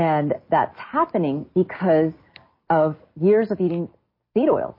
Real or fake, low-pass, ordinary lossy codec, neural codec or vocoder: real; 5.4 kHz; MP3, 24 kbps; none